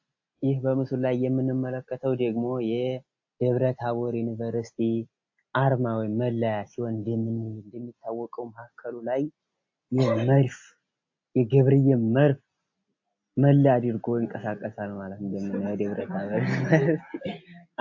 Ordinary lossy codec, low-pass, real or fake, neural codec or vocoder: AAC, 48 kbps; 7.2 kHz; real; none